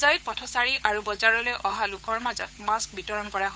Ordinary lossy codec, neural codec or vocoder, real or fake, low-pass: none; codec, 16 kHz, 8 kbps, FunCodec, trained on Chinese and English, 25 frames a second; fake; none